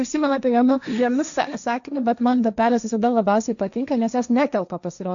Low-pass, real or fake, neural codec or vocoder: 7.2 kHz; fake; codec, 16 kHz, 1.1 kbps, Voila-Tokenizer